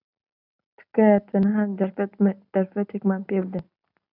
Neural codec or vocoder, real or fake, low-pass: none; real; 5.4 kHz